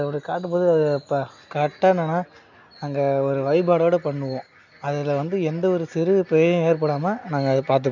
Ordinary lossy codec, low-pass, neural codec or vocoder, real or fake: none; 7.2 kHz; none; real